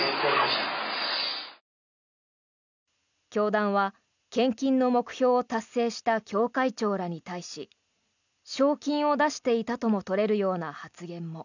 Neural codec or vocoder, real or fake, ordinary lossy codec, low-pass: none; real; none; 7.2 kHz